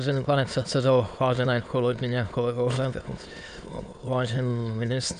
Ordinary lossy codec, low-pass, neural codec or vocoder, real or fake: AAC, 64 kbps; 9.9 kHz; autoencoder, 22.05 kHz, a latent of 192 numbers a frame, VITS, trained on many speakers; fake